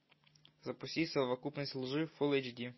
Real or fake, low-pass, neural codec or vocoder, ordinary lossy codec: real; 7.2 kHz; none; MP3, 24 kbps